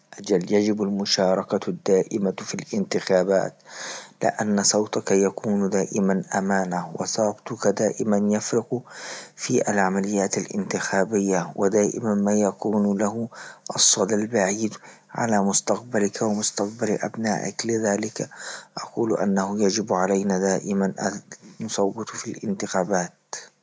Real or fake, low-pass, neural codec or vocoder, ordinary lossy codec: real; none; none; none